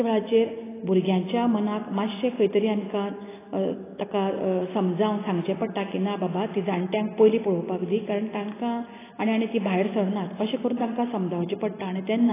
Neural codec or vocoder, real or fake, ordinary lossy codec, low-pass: none; real; AAC, 16 kbps; 3.6 kHz